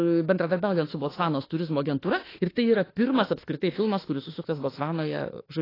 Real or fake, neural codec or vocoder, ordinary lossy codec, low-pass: fake; autoencoder, 48 kHz, 32 numbers a frame, DAC-VAE, trained on Japanese speech; AAC, 24 kbps; 5.4 kHz